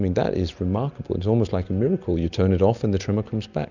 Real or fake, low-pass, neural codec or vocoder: real; 7.2 kHz; none